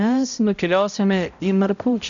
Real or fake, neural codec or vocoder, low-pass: fake; codec, 16 kHz, 0.5 kbps, X-Codec, HuBERT features, trained on balanced general audio; 7.2 kHz